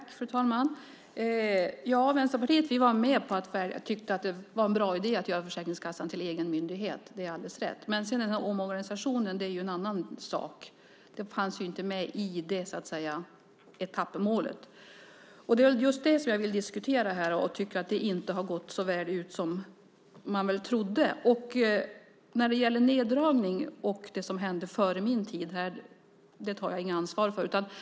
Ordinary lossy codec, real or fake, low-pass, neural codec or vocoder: none; real; none; none